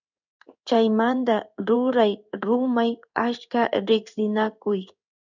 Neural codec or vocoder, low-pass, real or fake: codec, 16 kHz in and 24 kHz out, 1 kbps, XY-Tokenizer; 7.2 kHz; fake